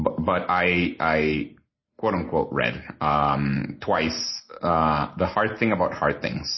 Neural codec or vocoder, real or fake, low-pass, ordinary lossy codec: none; real; 7.2 kHz; MP3, 24 kbps